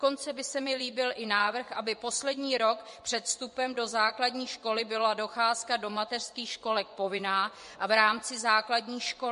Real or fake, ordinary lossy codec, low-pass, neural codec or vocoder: fake; MP3, 48 kbps; 10.8 kHz; vocoder, 24 kHz, 100 mel bands, Vocos